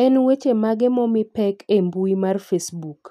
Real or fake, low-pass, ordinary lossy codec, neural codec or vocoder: real; 14.4 kHz; none; none